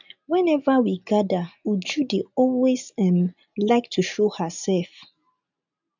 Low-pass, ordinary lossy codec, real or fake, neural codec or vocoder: 7.2 kHz; none; real; none